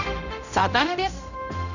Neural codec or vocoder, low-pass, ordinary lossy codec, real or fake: codec, 16 kHz, 0.5 kbps, X-Codec, HuBERT features, trained on general audio; 7.2 kHz; none; fake